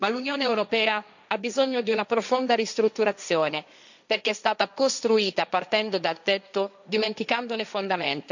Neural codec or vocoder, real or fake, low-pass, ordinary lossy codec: codec, 16 kHz, 1.1 kbps, Voila-Tokenizer; fake; 7.2 kHz; none